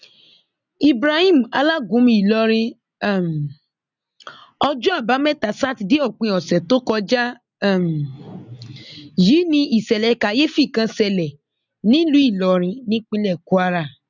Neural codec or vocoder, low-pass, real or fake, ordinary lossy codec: none; 7.2 kHz; real; none